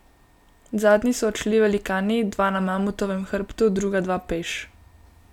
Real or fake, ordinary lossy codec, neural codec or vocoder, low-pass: real; none; none; 19.8 kHz